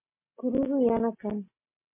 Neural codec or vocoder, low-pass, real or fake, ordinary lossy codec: none; 3.6 kHz; real; MP3, 24 kbps